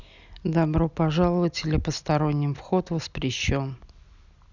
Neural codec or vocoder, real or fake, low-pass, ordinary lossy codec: none; real; 7.2 kHz; none